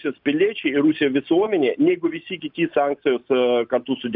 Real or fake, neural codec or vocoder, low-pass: real; none; 5.4 kHz